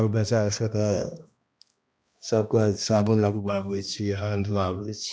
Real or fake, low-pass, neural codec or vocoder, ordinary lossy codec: fake; none; codec, 16 kHz, 1 kbps, X-Codec, HuBERT features, trained on balanced general audio; none